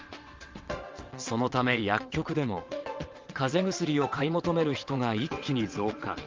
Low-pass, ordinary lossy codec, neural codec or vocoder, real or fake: 7.2 kHz; Opus, 32 kbps; vocoder, 22.05 kHz, 80 mel bands, WaveNeXt; fake